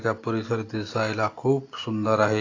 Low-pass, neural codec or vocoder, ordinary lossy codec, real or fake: 7.2 kHz; none; AAC, 32 kbps; real